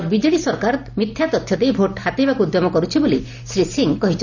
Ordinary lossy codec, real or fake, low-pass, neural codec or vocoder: none; real; 7.2 kHz; none